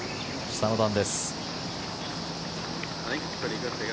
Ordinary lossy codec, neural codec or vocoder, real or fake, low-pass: none; none; real; none